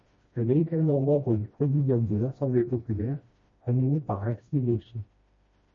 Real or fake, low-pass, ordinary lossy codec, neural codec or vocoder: fake; 7.2 kHz; MP3, 32 kbps; codec, 16 kHz, 1 kbps, FreqCodec, smaller model